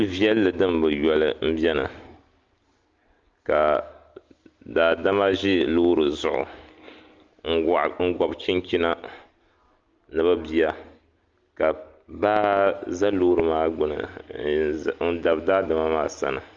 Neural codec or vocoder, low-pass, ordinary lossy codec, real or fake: none; 7.2 kHz; Opus, 24 kbps; real